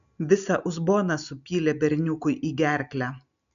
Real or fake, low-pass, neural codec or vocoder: real; 7.2 kHz; none